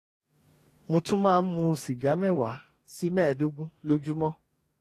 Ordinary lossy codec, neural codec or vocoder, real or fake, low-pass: AAC, 48 kbps; codec, 44.1 kHz, 2.6 kbps, DAC; fake; 14.4 kHz